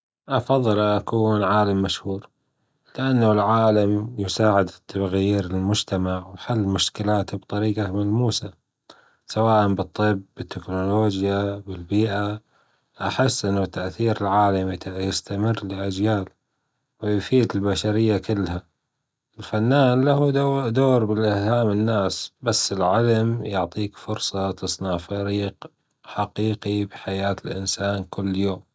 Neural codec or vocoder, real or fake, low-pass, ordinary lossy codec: none; real; none; none